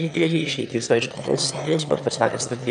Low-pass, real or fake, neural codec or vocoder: 9.9 kHz; fake; autoencoder, 22.05 kHz, a latent of 192 numbers a frame, VITS, trained on one speaker